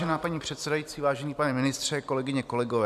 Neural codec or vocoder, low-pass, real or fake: vocoder, 44.1 kHz, 128 mel bands every 256 samples, BigVGAN v2; 14.4 kHz; fake